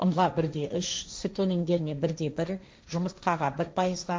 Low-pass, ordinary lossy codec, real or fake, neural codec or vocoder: none; none; fake; codec, 16 kHz, 1.1 kbps, Voila-Tokenizer